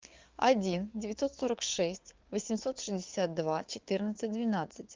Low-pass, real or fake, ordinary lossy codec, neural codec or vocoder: 7.2 kHz; fake; Opus, 32 kbps; codec, 16 kHz, 6 kbps, DAC